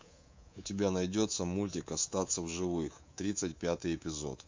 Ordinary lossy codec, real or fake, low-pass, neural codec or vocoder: MP3, 64 kbps; fake; 7.2 kHz; codec, 24 kHz, 3.1 kbps, DualCodec